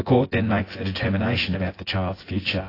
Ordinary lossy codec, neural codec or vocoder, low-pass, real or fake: AAC, 24 kbps; vocoder, 24 kHz, 100 mel bands, Vocos; 5.4 kHz; fake